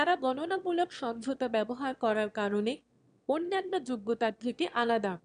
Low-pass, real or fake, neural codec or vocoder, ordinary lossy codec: 9.9 kHz; fake; autoencoder, 22.05 kHz, a latent of 192 numbers a frame, VITS, trained on one speaker; none